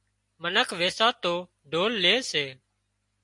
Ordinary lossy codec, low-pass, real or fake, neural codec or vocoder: MP3, 48 kbps; 10.8 kHz; real; none